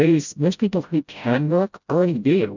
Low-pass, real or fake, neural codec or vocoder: 7.2 kHz; fake; codec, 16 kHz, 0.5 kbps, FreqCodec, smaller model